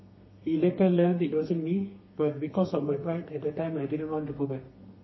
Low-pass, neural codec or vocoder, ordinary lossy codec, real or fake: 7.2 kHz; codec, 32 kHz, 1.9 kbps, SNAC; MP3, 24 kbps; fake